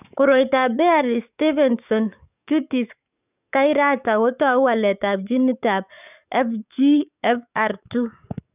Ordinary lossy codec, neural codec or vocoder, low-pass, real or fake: none; codec, 44.1 kHz, 7.8 kbps, DAC; 3.6 kHz; fake